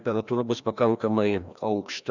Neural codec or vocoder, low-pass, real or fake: codec, 16 kHz, 1 kbps, FreqCodec, larger model; 7.2 kHz; fake